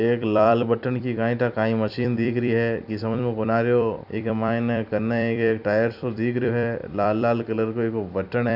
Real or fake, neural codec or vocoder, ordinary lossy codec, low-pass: fake; vocoder, 44.1 kHz, 128 mel bands every 256 samples, BigVGAN v2; AAC, 48 kbps; 5.4 kHz